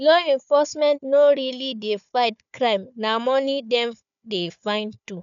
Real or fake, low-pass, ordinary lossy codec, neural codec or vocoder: fake; 7.2 kHz; none; codec, 16 kHz, 4 kbps, FunCodec, trained on Chinese and English, 50 frames a second